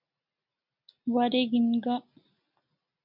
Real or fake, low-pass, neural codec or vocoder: real; 5.4 kHz; none